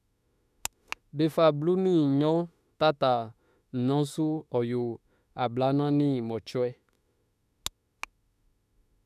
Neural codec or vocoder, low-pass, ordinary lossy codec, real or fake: autoencoder, 48 kHz, 32 numbers a frame, DAC-VAE, trained on Japanese speech; 14.4 kHz; none; fake